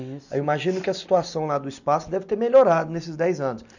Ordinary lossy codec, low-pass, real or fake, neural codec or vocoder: none; 7.2 kHz; real; none